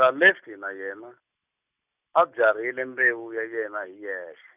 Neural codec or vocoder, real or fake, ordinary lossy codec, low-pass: none; real; none; 3.6 kHz